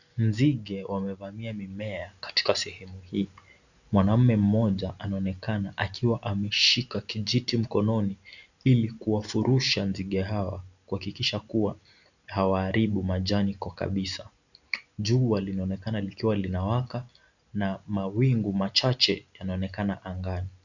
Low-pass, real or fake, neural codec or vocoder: 7.2 kHz; real; none